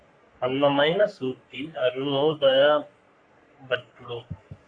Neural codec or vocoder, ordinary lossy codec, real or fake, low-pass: codec, 44.1 kHz, 3.4 kbps, Pupu-Codec; AAC, 48 kbps; fake; 9.9 kHz